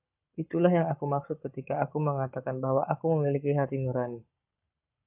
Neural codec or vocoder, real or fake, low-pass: codec, 44.1 kHz, 7.8 kbps, Pupu-Codec; fake; 3.6 kHz